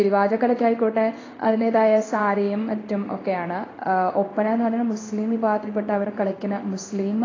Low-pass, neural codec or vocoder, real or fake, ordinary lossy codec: 7.2 kHz; codec, 16 kHz in and 24 kHz out, 1 kbps, XY-Tokenizer; fake; AAC, 32 kbps